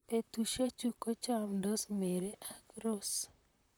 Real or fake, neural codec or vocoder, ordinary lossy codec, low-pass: fake; vocoder, 44.1 kHz, 128 mel bands, Pupu-Vocoder; none; none